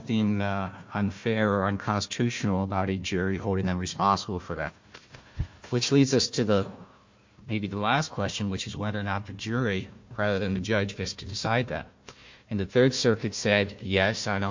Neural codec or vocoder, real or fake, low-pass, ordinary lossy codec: codec, 16 kHz, 1 kbps, FunCodec, trained on Chinese and English, 50 frames a second; fake; 7.2 kHz; MP3, 48 kbps